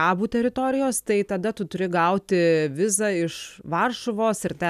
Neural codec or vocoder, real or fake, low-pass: none; real; 14.4 kHz